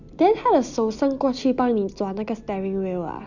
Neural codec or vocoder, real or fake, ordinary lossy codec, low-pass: none; real; none; 7.2 kHz